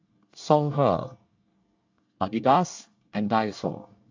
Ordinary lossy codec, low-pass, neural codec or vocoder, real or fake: AAC, 48 kbps; 7.2 kHz; codec, 24 kHz, 1 kbps, SNAC; fake